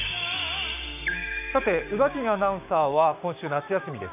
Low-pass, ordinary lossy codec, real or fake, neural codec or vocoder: 3.6 kHz; none; fake; codec, 16 kHz, 6 kbps, DAC